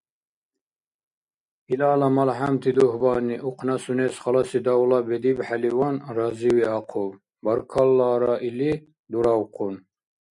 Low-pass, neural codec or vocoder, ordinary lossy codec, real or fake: 10.8 kHz; none; MP3, 96 kbps; real